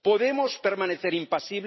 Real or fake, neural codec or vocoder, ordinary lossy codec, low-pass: real; none; MP3, 24 kbps; 7.2 kHz